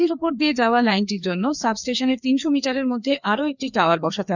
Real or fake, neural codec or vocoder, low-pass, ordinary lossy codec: fake; codec, 16 kHz, 2 kbps, FreqCodec, larger model; 7.2 kHz; none